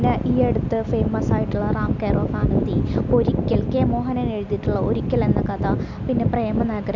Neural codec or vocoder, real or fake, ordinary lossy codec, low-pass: none; real; none; 7.2 kHz